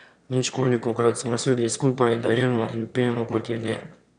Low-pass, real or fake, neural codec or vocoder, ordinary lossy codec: 9.9 kHz; fake; autoencoder, 22.05 kHz, a latent of 192 numbers a frame, VITS, trained on one speaker; none